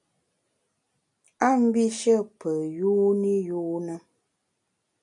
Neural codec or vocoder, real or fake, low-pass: none; real; 10.8 kHz